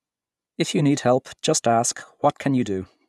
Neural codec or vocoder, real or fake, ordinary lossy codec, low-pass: vocoder, 24 kHz, 100 mel bands, Vocos; fake; none; none